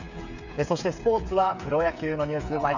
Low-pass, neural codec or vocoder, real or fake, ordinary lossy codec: 7.2 kHz; codec, 16 kHz, 8 kbps, FreqCodec, smaller model; fake; none